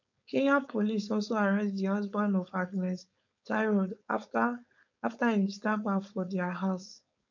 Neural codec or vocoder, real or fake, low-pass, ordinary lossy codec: codec, 16 kHz, 4.8 kbps, FACodec; fake; 7.2 kHz; none